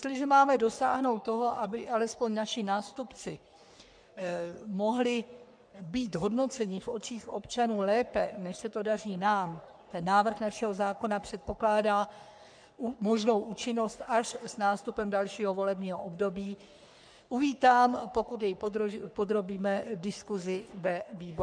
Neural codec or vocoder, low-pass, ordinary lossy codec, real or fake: codec, 44.1 kHz, 3.4 kbps, Pupu-Codec; 9.9 kHz; MP3, 96 kbps; fake